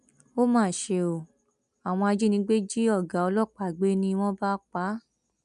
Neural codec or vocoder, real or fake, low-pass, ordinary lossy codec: none; real; 10.8 kHz; none